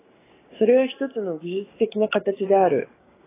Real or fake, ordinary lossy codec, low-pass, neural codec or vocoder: fake; AAC, 16 kbps; 3.6 kHz; codec, 16 kHz, 6 kbps, DAC